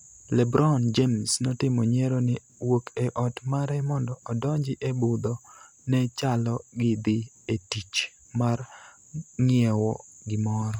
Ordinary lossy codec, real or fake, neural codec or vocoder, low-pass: none; real; none; 19.8 kHz